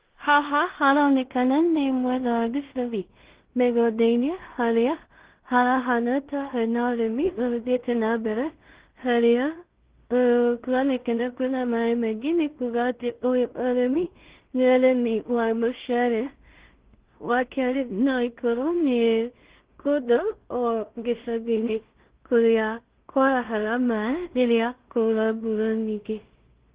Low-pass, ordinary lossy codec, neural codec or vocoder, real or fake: 3.6 kHz; Opus, 16 kbps; codec, 16 kHz in and 24 kHz out, 0.4 kbps, LongCat-Audio-Codec, two codebook decoder; fake